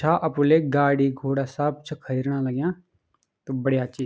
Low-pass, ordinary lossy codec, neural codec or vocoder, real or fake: none; none; none; real